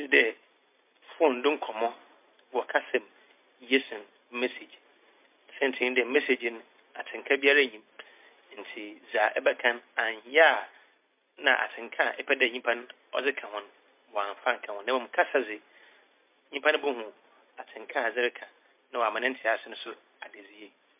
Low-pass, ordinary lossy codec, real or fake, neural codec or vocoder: 3.6 kHz; MP3, 32 kbps; real; none